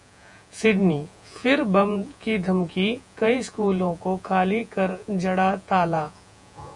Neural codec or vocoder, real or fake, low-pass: vocoder, 48 kHz, 128 mel bands, Vocos; fake; 10.8 kHz